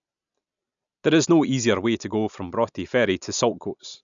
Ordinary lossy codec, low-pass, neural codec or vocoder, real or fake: none; 7.2 kHz; none; real